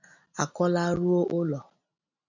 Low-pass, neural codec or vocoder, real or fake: 7.2 kHz; none; real